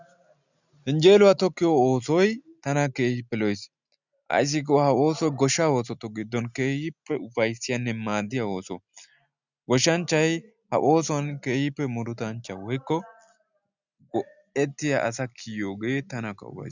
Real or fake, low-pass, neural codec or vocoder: real; 7.2 kHz; none